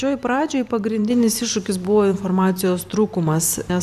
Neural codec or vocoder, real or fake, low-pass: vocoder, 44.1 kHz, 128 mel bands every 512 samples, BigVGAN v2; fake; 14.4 kHz